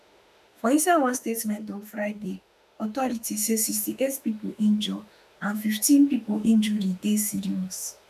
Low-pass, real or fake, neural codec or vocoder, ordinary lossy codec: 14.4 kHz; fake; autoencoder, 48 kHz, 32 numbers a frame, DAC-VAE, trained on Japanese speech; none